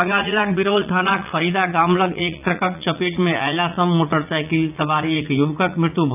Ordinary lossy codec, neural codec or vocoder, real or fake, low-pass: none; vocoder, 44.1 kHz, 80 mel bands, Vocos; fake; 3.6 kHz